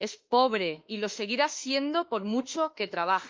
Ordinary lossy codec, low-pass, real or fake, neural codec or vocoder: Opus, 24 kbps; 7.2 kHz; fake; autoencoder, 48 kHz, 32 numbers a frame, DAC-VAE, trained on Japanese speech